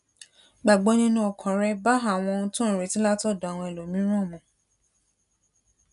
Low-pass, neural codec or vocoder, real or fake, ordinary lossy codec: 10.8 kHz; none; real; none